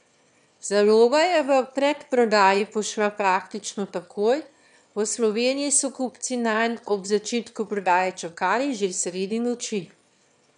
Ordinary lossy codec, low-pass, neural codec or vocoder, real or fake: none; 9.9 kHz; autoencoder, 22.05 kHz, a latent of 192 numbers a frame, VITS, trained on one speaker; fake